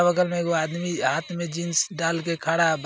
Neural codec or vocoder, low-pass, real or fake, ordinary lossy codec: none; none; real; none